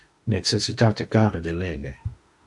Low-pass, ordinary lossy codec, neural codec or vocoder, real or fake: 10.8 kHz; AAC, 64 kbps; autoencoder, 48 kHz, 32 numbers a frame, DAC-VAE, trained on Japanese speech; fake